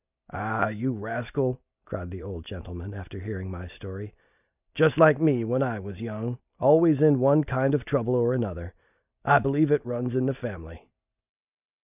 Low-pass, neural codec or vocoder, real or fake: 3.6 kHz; none; real